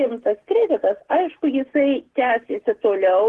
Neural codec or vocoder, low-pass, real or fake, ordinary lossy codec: none; 10.8 kHz; real; Opus, 16 kbps